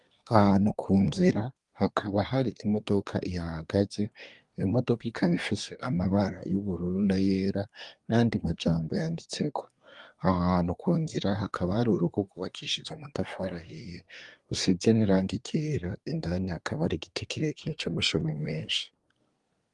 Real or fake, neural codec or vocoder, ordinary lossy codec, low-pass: fake; codec, 24 kHz, 1 kbps, SNAC; Opus, 32 kbps; 10.8 kHz